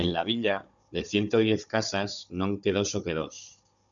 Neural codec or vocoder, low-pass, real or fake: codec, 16 kHz, 16 kbps, FunCodec, trained on Chinese and English, 50 frames a second; 7.2 kHz; fake